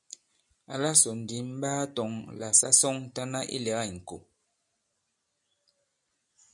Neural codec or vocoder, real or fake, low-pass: none; real; 10.8 kHz